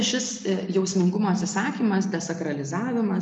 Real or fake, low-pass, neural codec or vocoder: real; 10.8 kHz; none